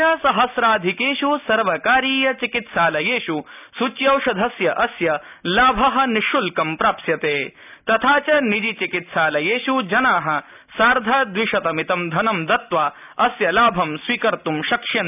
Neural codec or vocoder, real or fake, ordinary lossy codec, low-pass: none; real; none; 3.6 kHz